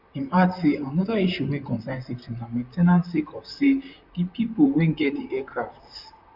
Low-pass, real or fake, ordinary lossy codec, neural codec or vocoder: 5.4 kHz; fake; none; vocoder, 44.1 kHz, 128 mel bands, Pupu-Vocoder